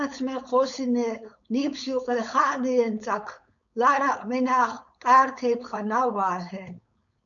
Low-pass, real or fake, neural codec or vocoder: 7.2 kHz; fake; codec, 16 kHz, 4.8 kbps, FACodec